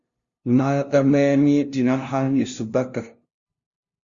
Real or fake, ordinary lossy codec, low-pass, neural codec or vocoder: fake; Opus, 64 kbps; 7.2 kHz; codec, 16 kHz, 0.5 kbps, FunCodec, trained on LibriTTS, 25 frames a second